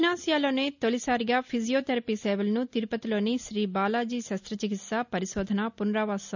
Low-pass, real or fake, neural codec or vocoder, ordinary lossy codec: 7.2 kHz; real; none; none